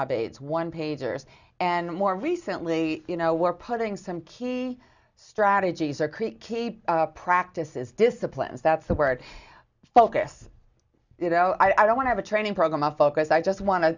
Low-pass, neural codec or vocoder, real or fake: 7.2 kHz; none; real